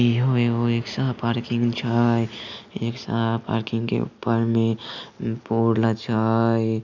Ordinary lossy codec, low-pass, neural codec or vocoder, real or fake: none; 7.2 kHz; none; real